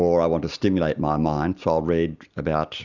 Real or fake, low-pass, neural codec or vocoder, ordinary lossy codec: real; 7.2 kHz; none; Opus, 64 kbps